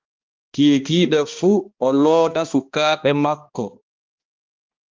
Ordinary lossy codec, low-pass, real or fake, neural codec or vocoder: Opus, 24 kbps; 7.2 kHz; fake; codec, 16 kHz, 1 kbps, X-Codec, HuBERT features, trained on balanced general audio